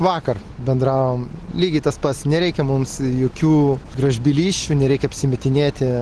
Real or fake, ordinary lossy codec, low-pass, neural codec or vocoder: real; Opus, 16 kbps; 10.8 kHz; none